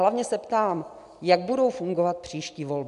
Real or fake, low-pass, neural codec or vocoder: real; 10.8 kHz; none